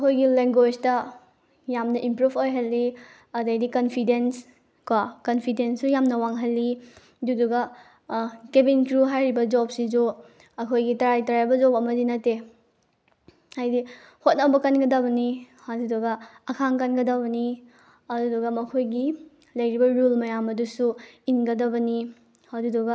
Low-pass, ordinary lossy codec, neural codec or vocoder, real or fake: none; none; none; real